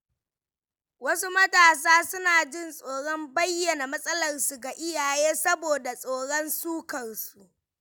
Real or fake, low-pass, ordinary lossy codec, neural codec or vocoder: real; none; none; none